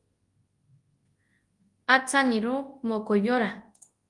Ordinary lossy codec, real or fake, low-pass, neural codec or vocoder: Opus, 24 kbps; fake; 10.8 kHz; codec, 24 kHz, 0.9 kbps, WavTokenizer, large speech release